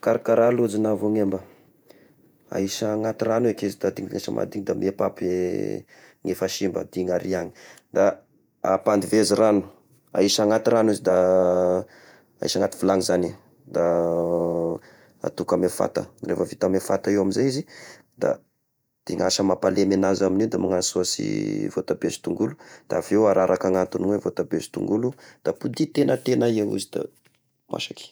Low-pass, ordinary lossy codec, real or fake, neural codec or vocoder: none; none; fake; vocoder, 48 kHz, 128 mel bands, Vocos